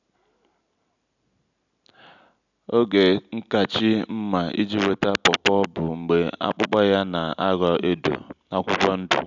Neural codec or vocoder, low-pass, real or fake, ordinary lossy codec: none; 7.2 kHz; real; none